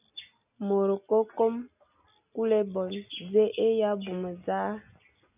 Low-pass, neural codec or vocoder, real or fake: 3.6 kHz; none; real